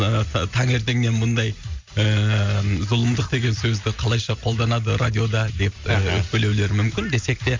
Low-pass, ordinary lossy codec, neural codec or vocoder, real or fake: 7.2 kHz; MP3, 48 kbps; none; real